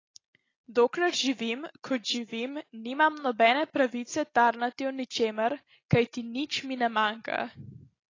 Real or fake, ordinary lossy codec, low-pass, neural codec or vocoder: real; AAC, 32 kbps; 7.2 kHz; none